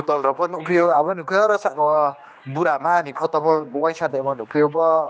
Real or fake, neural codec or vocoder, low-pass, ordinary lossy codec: fake; codec, 16 kHz, 2 kbps, X-Codec, HuBERT features, trained on general audio; none; none